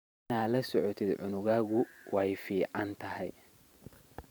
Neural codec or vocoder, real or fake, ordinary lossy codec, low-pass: vocoder, 44.1 kHz, 128 mel bands every 512 samples, BigVGAN v2; fake; none; none